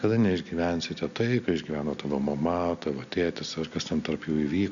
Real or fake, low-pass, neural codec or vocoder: real; 7.2 kHz; none